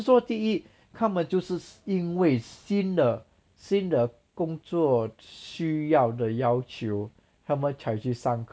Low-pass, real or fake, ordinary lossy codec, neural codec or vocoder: none; real; none; none